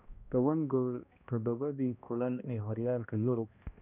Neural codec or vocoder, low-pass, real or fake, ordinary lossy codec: codec, 16 kHz, 1 kbps, X-Codec, HuBERT features, trained on balanced general audio; 3.6 kHz; fake; none